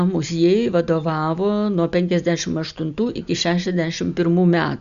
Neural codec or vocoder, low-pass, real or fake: none; 7.2 kHz; real